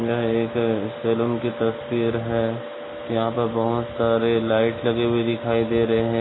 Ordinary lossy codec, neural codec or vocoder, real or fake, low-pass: AAC, 16 kbps; none; real; 7.2 kHz